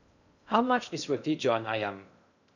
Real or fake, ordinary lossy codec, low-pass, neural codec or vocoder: fake; none; 7.2 kHz; codec, 16 kHz in and 24 kHz out, 0.6 kbps, FocalCodec, streaming, 2048 codes